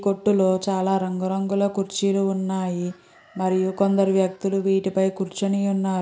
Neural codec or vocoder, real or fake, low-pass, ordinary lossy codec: none; real; none; none